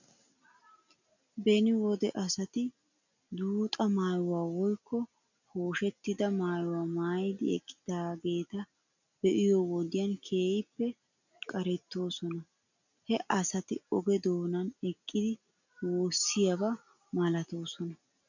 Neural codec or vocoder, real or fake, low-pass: none; real; 7.2 kHz